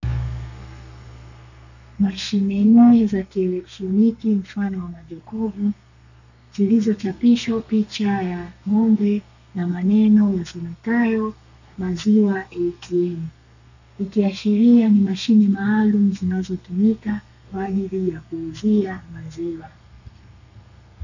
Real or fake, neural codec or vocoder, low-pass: fake; codec, 32 kHz, 1.9 kbps, SNAC; 7.2 kHz